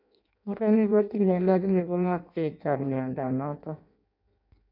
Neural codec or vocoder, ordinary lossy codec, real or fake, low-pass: codec, 16 kHz in and 24 kHz out, 0.6 kbps, FireRedTTS-2 codec; none; fake; 5.4 kHz